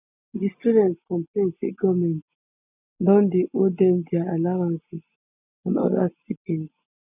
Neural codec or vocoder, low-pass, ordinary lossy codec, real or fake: none; 3.6 kHz; none; real